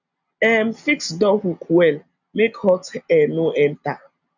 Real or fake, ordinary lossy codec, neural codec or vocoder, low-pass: real; none; none; 7.2 kHz